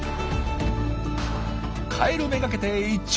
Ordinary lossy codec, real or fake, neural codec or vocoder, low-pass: none; real; none; none